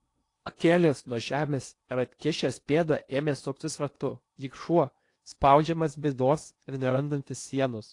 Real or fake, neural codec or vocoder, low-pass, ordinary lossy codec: fake; codec, 16 kHz in and 24 kHz out, 0.8 kbps, FocalCodec, streaming, 65536 codes; 10.8 kHz; AAC, 48 kbps